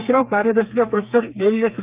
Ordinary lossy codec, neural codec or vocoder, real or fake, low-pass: Opus, 24 kbps; codec, 32 kHz, 1.9 kbps, SNAC; fake; 3.6 kHz